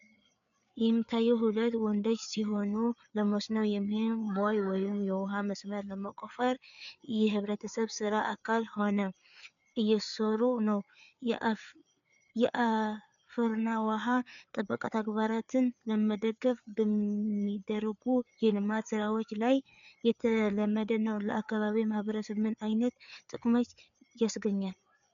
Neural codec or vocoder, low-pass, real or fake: codec, 16 kHz, 4 kbps, FreqCodec, larger model; 7.2 kHz; fake